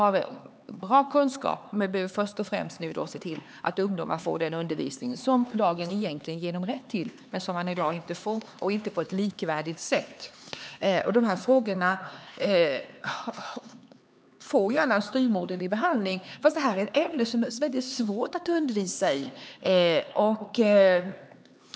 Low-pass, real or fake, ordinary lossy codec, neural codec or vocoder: none; fake; none; codec, 16 kHz, 4 kbps, X-Codec, HuBERT features, trained on LibriSpeech